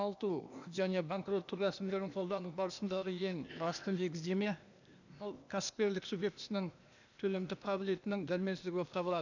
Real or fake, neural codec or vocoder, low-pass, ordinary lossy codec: fake; codec, 16 kHz, 0.8 kbps, ZipCodec; 7.2 kHz; none